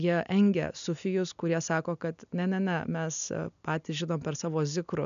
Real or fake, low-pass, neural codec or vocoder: real; 7.2 kHz; none